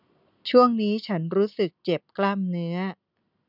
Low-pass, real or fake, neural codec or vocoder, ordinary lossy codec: 5.4 kHz; real; none; none